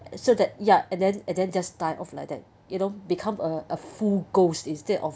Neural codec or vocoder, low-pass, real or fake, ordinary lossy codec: none; none; real; none